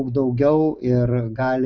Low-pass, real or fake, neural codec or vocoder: 7.2 kHz; real; none